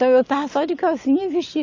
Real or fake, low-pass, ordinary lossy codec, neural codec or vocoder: real; 7.2 kHz; AAC, 48 kbps; none